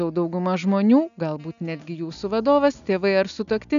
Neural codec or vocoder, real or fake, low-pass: none; real; 7.2 kHz